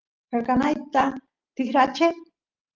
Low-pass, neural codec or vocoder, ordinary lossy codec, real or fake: 7.2 kHz; none; Opus, 32 kbps; real